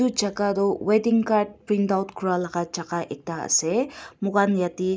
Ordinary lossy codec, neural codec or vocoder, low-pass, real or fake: none; none; none; real